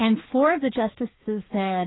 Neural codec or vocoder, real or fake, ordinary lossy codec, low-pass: codec, 16 kHz, 2 kbps, FreqCodec, larger model; fake; AAC, 16 kbps; 7.2 kHz